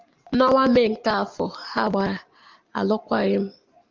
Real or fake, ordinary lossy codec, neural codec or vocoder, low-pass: real; Opus, 24 kbps; none; 7.2 kHz